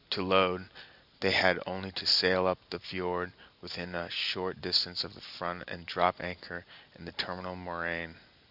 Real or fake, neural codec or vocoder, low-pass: real; none; 5.4 kHz